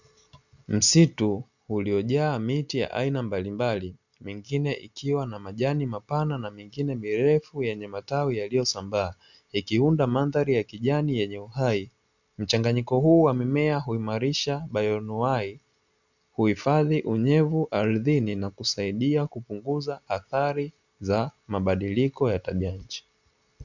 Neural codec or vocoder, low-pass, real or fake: none; 7.2 kHz; real